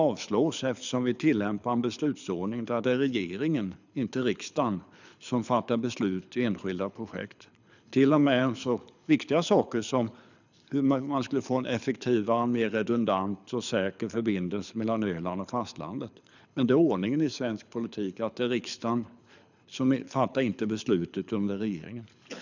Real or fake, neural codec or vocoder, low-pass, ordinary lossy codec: fake; codec, 24 kHz, 6 kbps, HILCodec; 7.2 kHz; none